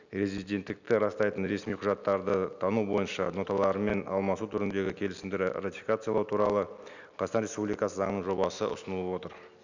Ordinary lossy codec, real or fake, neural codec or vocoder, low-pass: none; real; none; 7.2 kHz